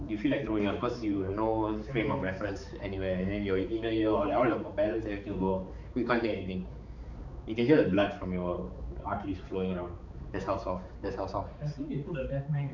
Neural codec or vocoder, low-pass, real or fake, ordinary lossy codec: codec, 16 kHz, 4 kbps, X-Codec, HuBERT features, trained on balanced general audio; 7.2 kHz; fake; none